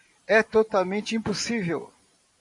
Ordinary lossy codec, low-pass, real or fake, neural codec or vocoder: MP3, 96 kbps; 10.8 kHz; fake; vocoder, 44.1 kHz, 128 mel bands every 512 samples, BigVGAN v2